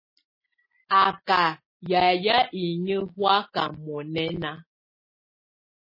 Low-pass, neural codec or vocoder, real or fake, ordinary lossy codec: 5.4 kHz; none; real; MP3, 24 kbps